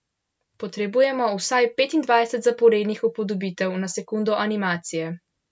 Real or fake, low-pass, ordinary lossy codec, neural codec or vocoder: real; none; none; none